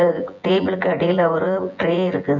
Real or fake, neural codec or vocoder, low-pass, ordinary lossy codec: fake; vocoder, 24 kHz, 100 mel bands, Vocos; 7.2 kHz; none